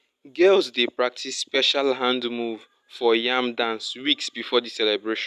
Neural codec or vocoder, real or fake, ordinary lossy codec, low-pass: none; real; none; 14.4 kHz